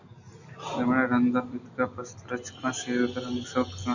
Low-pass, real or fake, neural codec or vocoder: 7.2 kHz; real; none